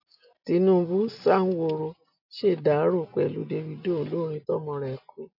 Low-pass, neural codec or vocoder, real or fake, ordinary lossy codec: 5.4 kHz; none; real; AAC, 48 kbps